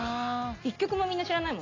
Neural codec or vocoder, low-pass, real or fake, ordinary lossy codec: none; 7.2 kHz; real; AAC, 32 kbps